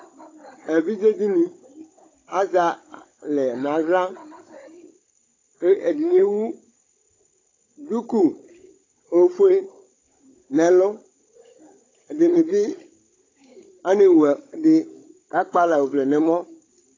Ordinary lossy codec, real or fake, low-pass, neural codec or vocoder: AAC, 32 kbps; fake; 7.2 kHz; codec, 16 kHz, 16 kbps, FunCodec, trained on Chinese and English, 50 frames a second